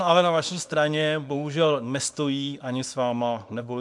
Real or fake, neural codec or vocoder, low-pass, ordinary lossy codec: fake; codec, 24 kHz, 0.9 kbps, WavTokenizer, small release; 10.8 kHz; MP3, 96 kbps